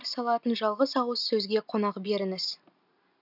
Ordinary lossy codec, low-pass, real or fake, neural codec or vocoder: none; 5.4 kHz; real; none